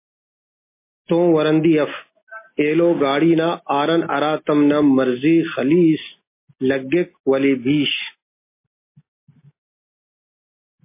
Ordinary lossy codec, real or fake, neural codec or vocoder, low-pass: MP3, 24 kbps; real; none; 3.6 kHz